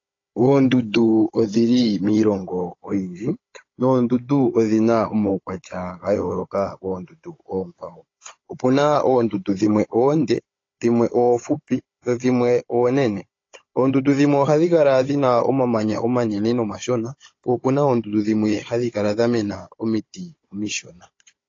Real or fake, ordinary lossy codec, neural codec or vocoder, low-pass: fake; AAC, 32 kbps; codec, 16 kHz, 16 kbps, FunCodec, trained on Chinese and English, 50 frames a second; 7.2 kHz